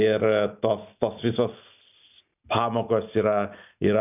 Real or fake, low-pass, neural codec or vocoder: real; 3.6 kHz; none